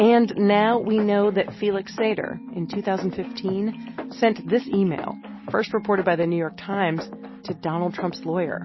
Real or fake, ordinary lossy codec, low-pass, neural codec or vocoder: real; MP3, 24 kbps; 7.2 kHz; none